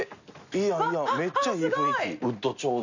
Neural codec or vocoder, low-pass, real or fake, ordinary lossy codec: none; 7.2 kHz; real; none